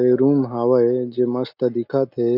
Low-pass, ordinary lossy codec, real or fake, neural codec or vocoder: 5.4 kHz; MP3, 48 kbps; real; none